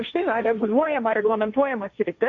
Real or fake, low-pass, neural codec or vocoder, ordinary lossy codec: fake; 7.2 kHz; codec, 16 kHz, 1.1 kbps, Voila-Tokenizer; MP3, 48 kbps